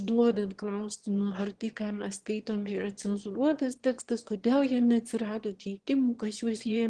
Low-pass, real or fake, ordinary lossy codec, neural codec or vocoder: 9.9 kHz; fake; Opus, 16 kbps; autoencoder, 22.05 kHz, a latent of 192 numbers a frame, VITS, trained on one speaker